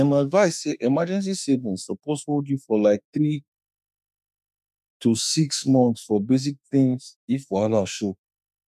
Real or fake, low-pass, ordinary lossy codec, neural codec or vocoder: fake; 14.4 kHz; none; autoencoder, 48 kHz, 32 numbers a frame, DAC-VAE, trained on Japanese speech